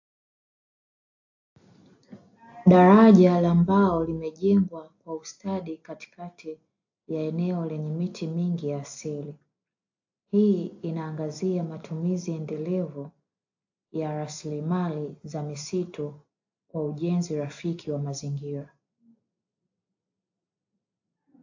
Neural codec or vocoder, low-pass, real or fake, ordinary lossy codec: none; 7.2 kHz; real; AAC, 48 kbps